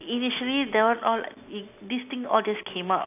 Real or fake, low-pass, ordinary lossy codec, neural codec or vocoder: real; 3.6 kHz; none; none